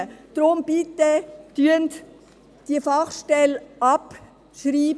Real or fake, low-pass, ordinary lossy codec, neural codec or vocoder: real; none; none; none